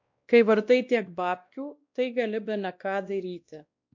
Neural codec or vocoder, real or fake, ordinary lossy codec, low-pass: codec, 16 kHz, 1 kbps, X-Codec, WavLM features, trained on Multilingual LibriSpeech; fake; MP3, 64 kbps; 7.2 kHz